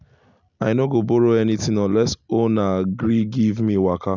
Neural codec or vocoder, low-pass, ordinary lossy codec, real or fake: none; 7.2 kHz; none; real